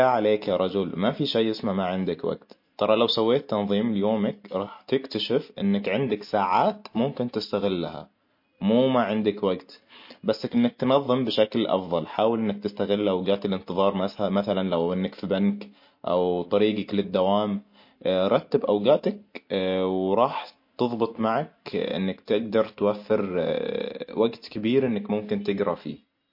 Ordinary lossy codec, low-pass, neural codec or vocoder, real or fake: MP3, 32 kbps; 5.4 kHz; none; real